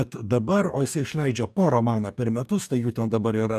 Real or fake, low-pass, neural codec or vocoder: fake; 14.4 kHz; codec, 44.1 kHz, 2.6 kbps, DAC